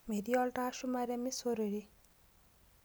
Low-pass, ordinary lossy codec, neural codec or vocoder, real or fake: none; none; none; real